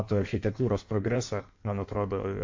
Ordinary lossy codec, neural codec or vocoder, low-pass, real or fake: AAC, 48 kbps; codec, 16 kHz in and 24 kHz out, 1.1 kbps, FireRedTTS-2 codec; 7.2 kHz; fake